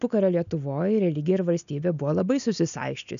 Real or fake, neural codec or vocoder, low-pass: real; none; 7.2 kHz